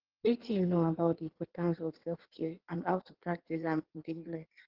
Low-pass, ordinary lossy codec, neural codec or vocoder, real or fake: 5.4 kHz; Opus, 16 kbps; codec, 16 kHz in and 24 kHz out, 1.1 kbps, FireRedTTS-2 codec; fake